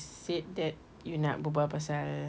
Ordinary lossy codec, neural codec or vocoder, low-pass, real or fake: none; none; none; real